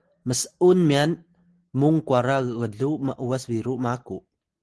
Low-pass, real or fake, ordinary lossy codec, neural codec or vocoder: 10.8 kHz; real; Opus, 16 kbps; none